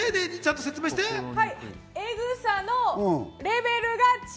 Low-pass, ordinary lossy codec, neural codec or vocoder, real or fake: none; none; none; real